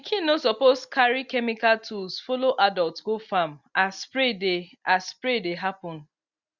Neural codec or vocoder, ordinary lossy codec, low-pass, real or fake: none; Opus, 64 kbps; 7.2 kHz; real